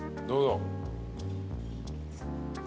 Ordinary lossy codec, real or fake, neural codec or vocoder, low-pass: none; real; none; none